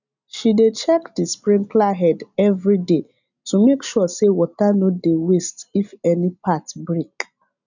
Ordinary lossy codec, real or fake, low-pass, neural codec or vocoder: none; real; 7.2 kHz; none